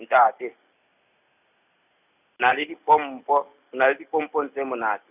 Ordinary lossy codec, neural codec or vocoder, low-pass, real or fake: none; none; 3.6 kHz; real